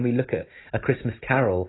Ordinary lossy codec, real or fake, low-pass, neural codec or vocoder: AAC, 16 kbps; real; 7.2 kHz; none